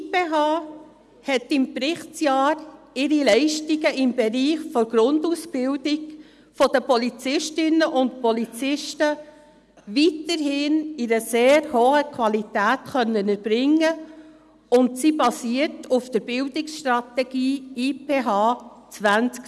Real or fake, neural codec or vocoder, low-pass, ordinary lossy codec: real; none; none; none